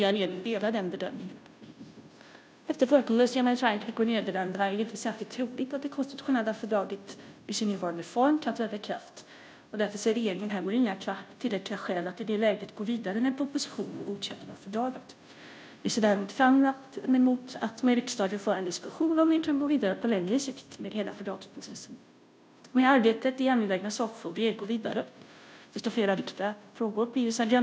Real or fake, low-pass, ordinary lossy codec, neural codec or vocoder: fake; none; none; codec, 16 kHz, 0.5 kbps, FunCodec, trained on Chinese and English, 25 frames a second